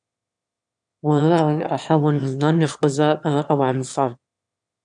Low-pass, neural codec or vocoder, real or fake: 9.9 kHz; autoencoder, 22.05 kHz, a latent of 192 numbers a frame, VITS, trained on one speaker; fake